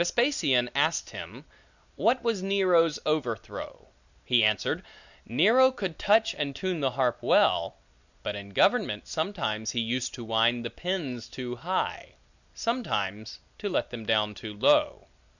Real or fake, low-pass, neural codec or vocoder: real; 7.2 kHz; none